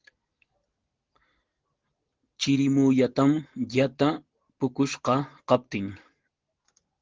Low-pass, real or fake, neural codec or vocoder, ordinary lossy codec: 7.2 kHz; real; none; Opus, 16 kbps